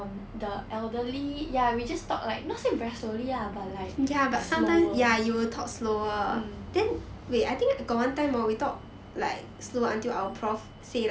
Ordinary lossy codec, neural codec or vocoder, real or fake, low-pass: none; none; real; none